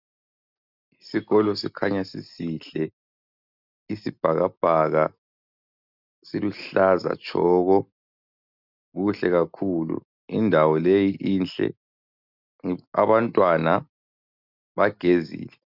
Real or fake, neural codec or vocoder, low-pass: real; none; 5.4 kHz